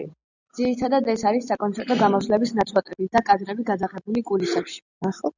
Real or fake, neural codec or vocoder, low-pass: real; none; 7.2 kHz